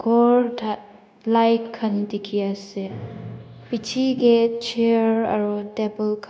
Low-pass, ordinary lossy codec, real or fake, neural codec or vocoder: none; none; fake; codec, 16 kHz, 0.9 kbps, LongCat-Audio-Codec